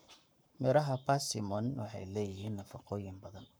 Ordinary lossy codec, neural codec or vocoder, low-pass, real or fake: none; codec, 44.1 kHz, 7.8 kbps, Pupu-Codec; none; fake